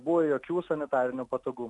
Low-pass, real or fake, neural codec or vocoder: 10.8 kHz; real; none